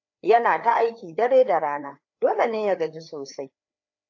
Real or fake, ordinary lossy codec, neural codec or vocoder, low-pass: fake; AAC, 48 kbps; codec, 16 kHz, 4 kbps, FreqCodec, larger model; 7.2 kHz